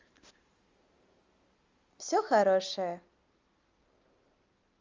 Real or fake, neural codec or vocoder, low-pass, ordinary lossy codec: real; none; 7.2 kHz; Opus, 32 kbps